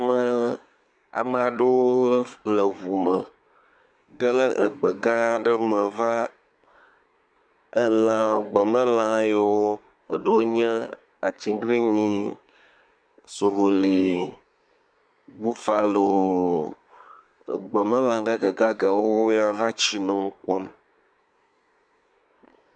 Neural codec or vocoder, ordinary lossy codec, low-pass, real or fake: codec, 24 kHz, 1 kbps, SNAC; MP3, 96 kbps; 9.9 kHz; fake